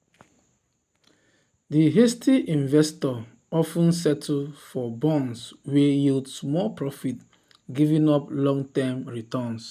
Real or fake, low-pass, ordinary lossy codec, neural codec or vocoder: real; 14.4 kHz; none; none